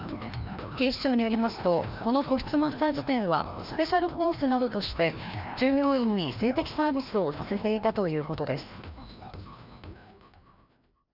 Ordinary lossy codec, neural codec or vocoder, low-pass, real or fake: none; codec, 16 kHz, 1 kbps, FreqCodec, larger model; 5.4 kHz; fake